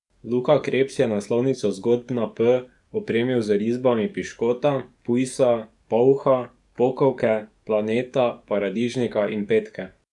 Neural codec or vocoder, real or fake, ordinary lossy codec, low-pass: codec, 44.1 kHz, 7.8 kbps, DAC; fake; none; 10.8 kHz